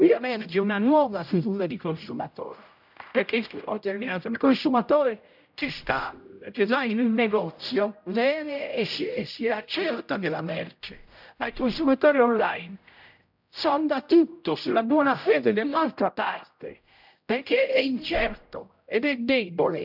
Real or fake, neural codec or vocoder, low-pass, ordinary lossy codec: fake; codec, 16 kHz, 0.5 kbps, X-Codec, HuBERT features, trained on general audio; 5.4 kHz; none